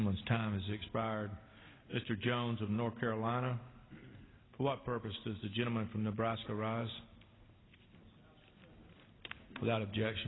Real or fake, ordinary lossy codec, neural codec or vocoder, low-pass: real; AAC, 16 kbps; none; 7.2 kHz